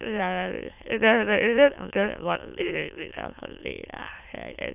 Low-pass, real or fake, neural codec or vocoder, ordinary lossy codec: 3.6 kHz; fake; autoencoder, 22.05 kHz, a latent of 192 numbers a frame, VITS, trained on many speakers; none